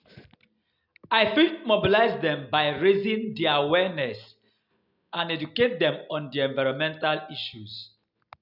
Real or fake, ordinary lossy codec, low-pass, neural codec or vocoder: real; none; 5.4 kHz; none